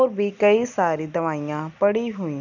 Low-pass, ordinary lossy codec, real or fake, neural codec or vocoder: 7.2 kHz; none; real; none